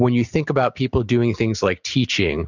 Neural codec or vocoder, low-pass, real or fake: none; 7.2 kHz; real